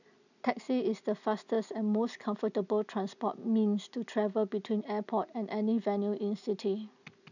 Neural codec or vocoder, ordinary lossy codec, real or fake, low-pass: none; none; real; 7.2 kHz